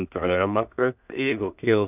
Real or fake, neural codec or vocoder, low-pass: fake; codec, 16 kHz in and 24 kHz out, 0.4 kbps, LongCat-Audio-Codec, two codebook decoder; 3.6 kHz